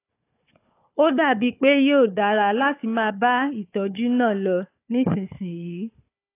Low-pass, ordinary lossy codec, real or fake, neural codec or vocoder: 3.6 kHz; AAC, 24 kbps; fake; codec, 16 kHz, 4 kbps, FunCodec, trained on Chinese and English, 50 frames a second